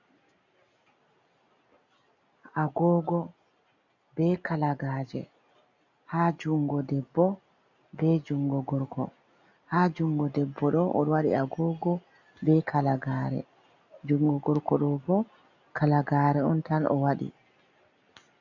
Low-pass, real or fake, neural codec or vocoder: 7.2 kHz; real; none